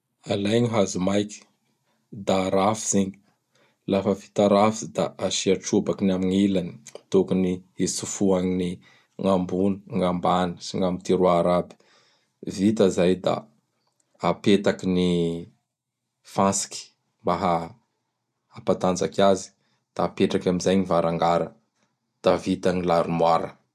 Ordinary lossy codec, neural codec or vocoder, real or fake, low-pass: none; none; real; 14.4 kHz